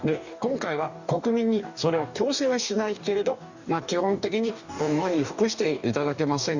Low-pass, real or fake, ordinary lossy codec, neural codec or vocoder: 7.2 kHz; fake; none; codec, 44.1 kHz, 2.6 kbps, DAC